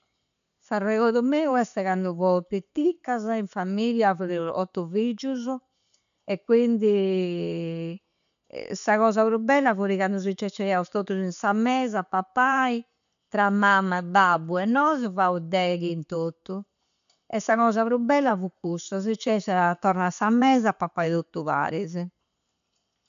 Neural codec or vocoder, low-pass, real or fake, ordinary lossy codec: none; 7.2 kHz; real; none